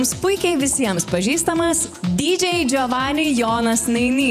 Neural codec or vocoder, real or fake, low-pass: vocoder, 48 kHz, 128 mel bands, Vocos; fake; 14.4 kHz